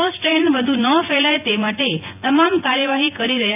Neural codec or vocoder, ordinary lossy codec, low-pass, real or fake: vocoder, 24 kHz, 100 mel bands, Vocos; none; 3.6 kHz; fake